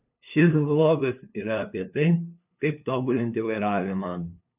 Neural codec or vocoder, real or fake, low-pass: codec, 16 kHz, 2 kbps, FunCodec, trained on LibriTTS, 25 frames a second; fake; 3.6 kHz